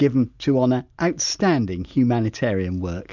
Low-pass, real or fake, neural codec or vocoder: 7.2 kHz; real; none